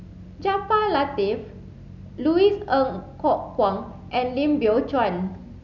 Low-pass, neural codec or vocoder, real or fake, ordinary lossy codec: 7.2 kHz; none; real; none